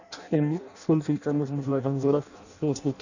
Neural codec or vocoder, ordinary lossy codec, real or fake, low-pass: codec, 16 kHz in and 24 kHz out, 0.6 kbps, FireRedTTS-2 codec; none; fake; 7.2 kHz